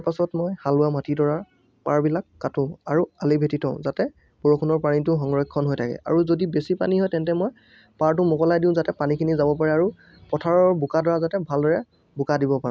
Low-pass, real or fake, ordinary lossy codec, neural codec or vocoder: none; real; none; none